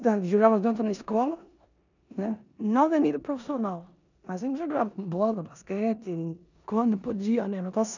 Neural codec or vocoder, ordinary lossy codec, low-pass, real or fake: codec, 16 kHz in and 24 kHz out, 0.9 kbps, LongCat-Audio-Codec, four codebook decoder; none; 7.2 kHz; fake